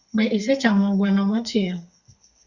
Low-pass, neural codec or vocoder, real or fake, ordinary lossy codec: 7.2 kHz; codec, 32 kHz, 1.9 kbps, SNAC; fake; Opus, 64 kbps